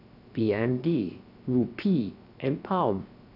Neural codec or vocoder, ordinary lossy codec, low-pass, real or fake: codec, 16 kHz, 0.7 kbps, FocalCodec; none; 5.4 kHz; fake